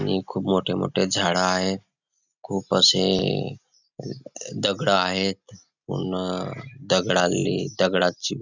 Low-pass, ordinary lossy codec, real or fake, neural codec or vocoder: 7.2 kHz; none; real; none